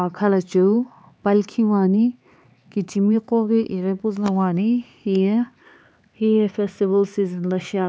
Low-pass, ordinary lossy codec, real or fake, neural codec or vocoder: none; none; fake; codec, 16 kHz, 4 kbps, X-Codec, HuBERT features, trained on LibriSpeech